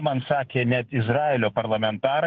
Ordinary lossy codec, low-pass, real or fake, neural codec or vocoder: Opus, 16 kbps; 7.2 kHz; fake; autoencoder, 48 kHz, 128 numbers a frame, DAC-VAE, trained on Japanese speech